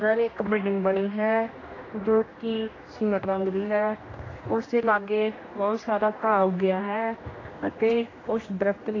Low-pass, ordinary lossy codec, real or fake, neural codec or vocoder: 7.2 kHz; AAC, 32 kbps; fake; codec, 16 kHz, 1 kbps, X-Codec, HuBERT features, trained on general audio